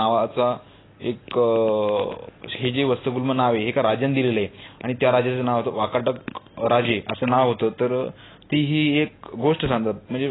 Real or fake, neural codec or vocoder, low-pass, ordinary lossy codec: real; none; 7.2 kHz; AAC, 16 kbps